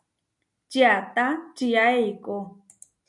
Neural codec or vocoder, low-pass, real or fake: none; 10.8 kHz; real